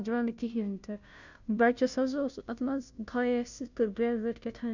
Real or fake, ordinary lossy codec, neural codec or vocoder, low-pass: fake; none; codec, 16 kHz, 0.5 kbps, FunCodec, trained on Chinese and English, 25 frames a second; 7.2 kHz